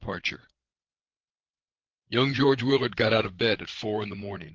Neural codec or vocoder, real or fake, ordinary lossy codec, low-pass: codec, 16 kHz, 8 kbps, FreqCodec, larger model; fake; Opus, 16 kbps; 7.2 kHz